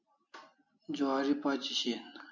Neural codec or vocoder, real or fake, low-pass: none; real; 7.2 kHz